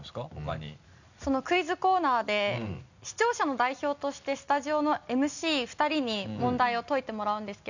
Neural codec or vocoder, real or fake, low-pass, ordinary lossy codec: none; real; 7.2 kHz; none